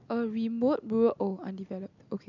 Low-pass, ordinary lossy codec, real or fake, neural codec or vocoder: 7.2 kHz; none; real; none